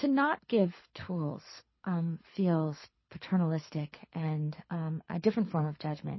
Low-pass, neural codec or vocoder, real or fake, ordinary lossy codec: 7.2 kHz; codec, 24 kHz, 6 kbps, HILCodec; fake; MP3, 24 kbps